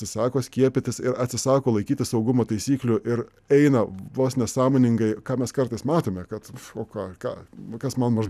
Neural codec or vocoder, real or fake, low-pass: none; real; 14.4 kHz